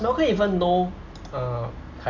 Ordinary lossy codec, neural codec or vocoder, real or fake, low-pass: none; none; real; 7.2 kHz